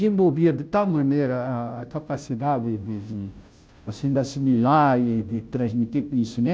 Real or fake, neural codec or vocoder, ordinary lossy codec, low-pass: fake; codec, 16 kHz, 0.5 kbps, FunCodec, trained on Chinese and English, 25 frames a second; none; none